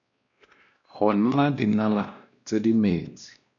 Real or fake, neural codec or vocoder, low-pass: fake; codec, 16 kHz, 1 kbps, X-Codec, WavLM features, trained on Multilingual LibriSpeech; 7.2 kHz